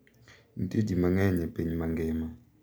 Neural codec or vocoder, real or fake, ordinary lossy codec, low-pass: none; real; none; none